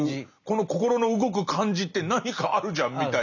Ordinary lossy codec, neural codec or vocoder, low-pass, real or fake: none; none; 7.2 kHz; real